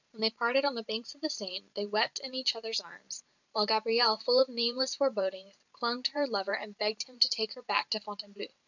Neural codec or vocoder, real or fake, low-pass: vocoder, 44.1 kHz, 128 mel bands every 512 samples, BigVGAN v2; fake; 7.2 kHz